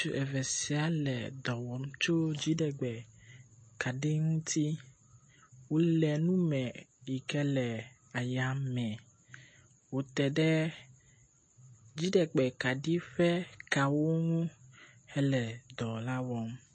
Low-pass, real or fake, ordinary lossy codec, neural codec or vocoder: 10.8 kHz; real; MP3, 32 kbps; none